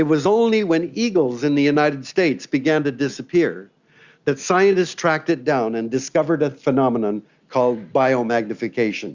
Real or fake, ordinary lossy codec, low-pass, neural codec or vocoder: real; Opus, 64 kbps; 7.2 kHz; none